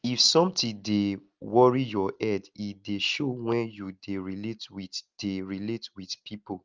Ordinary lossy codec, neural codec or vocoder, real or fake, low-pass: Opus, 32 kbps; none; real; 7.2 kHz